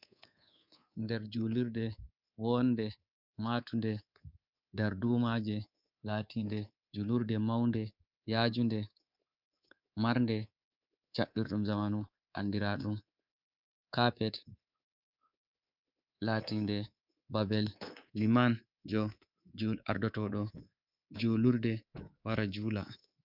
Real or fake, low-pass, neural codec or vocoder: fake; 5.4 kHz; codec, 24 kHz, 3.1 kbps, DualCodec